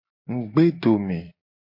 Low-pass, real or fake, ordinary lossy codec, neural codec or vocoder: 5.4 kHz; real; MP3, 32 kbps; none